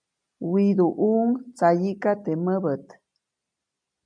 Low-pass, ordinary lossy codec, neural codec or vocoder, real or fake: 9.9 kHz; MP3, 96 kbps; none; real